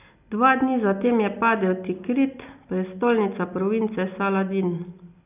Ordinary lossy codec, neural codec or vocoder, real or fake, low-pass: none; none; real; 3.6 kHz